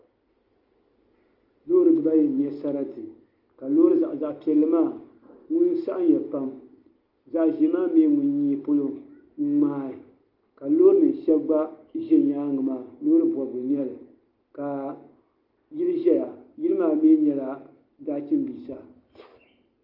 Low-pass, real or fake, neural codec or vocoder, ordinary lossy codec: 5.4 kHz; real; none; Opus, 24 kbps